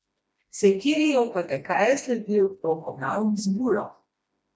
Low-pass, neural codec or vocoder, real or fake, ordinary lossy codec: none; codec, 16 kHz, 1 kbps, FreqCodec, smaller model; fake; none